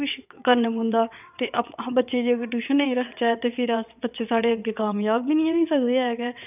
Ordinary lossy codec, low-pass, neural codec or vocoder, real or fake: none; 3.6 kHz; vocoder, 22.05 kHz, 80 mel bands, WaveNeXt; fake